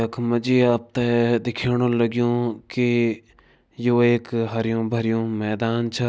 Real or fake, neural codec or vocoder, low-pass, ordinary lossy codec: real; none; none; none